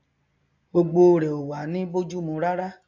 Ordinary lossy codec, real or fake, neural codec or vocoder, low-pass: AAC, 48 kbps; real; none; 7.2 kHz